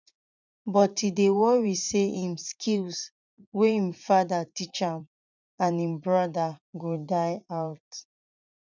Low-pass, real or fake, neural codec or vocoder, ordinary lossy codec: 7.2 kHz; fake; vocoder, 44.1 kHz, 80 mel bands, Vocos; none